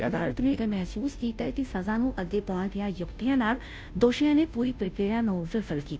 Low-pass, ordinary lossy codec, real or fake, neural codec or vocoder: none; none; fake; codec, 16 kHz, 0.5 kbps, FunCodec, trained on Chinese and English, 25 frames a second